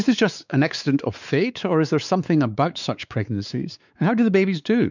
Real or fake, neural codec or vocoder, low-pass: fake; codec, 16 kHz, 4 kbps, X-Codec, WavLM features, trained on Multilingual LibriSpeech; 7.2 kHz